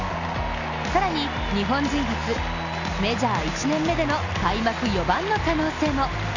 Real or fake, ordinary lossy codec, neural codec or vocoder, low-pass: real; none; none; 7.2 kHz